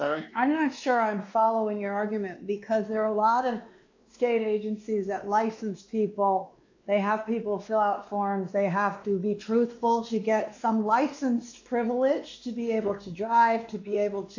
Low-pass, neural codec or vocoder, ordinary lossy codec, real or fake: 7.2 kHz; codec, 16 kHz, 2 kbps, X-Codec, WavLM features, trained on Multilingual LibriSpeech; AAC, 48 kbps; fake